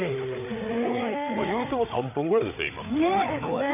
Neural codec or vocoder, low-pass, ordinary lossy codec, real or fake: codec, 16 kHz, 8 kbps, FreqCodec, larger model; 3.6 kHz; none; fake